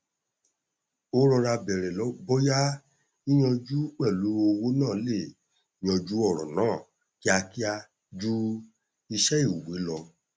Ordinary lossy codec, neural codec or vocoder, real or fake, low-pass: none; none; real; none